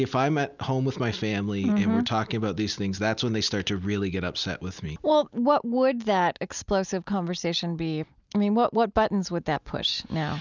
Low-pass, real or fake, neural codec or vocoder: 7.2 kHz; real; none